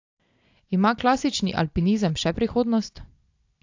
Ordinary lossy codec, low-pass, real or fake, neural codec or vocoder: AAC, 48 kbps; 7.2 kHz; real; none